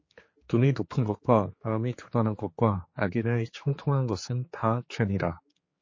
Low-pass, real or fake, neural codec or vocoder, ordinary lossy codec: 7.2 kHz; fake; codec, 16 kHz, 4 kbps, X-Codec, HuBERT features, trained on general audio; MP3, 32 kbps